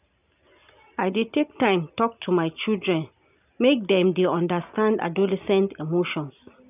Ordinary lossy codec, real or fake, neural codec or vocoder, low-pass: none; real; none; 3.6 kHz